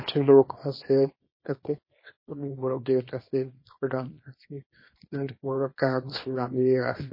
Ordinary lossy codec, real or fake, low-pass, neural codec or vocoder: MP3, 24 kbps; fake; 5.4 kHz; codec, 24 kHz, 0.9 kbps, WavTokenizer, small release